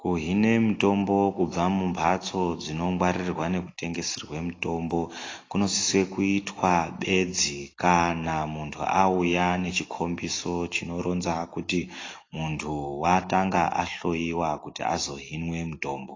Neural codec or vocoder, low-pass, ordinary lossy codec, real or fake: none; 7.2 kHz; AAC, 32 kbps; real